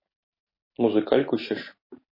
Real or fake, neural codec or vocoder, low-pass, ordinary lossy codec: real; none; 5.4 kHz; MP3, 24 kbps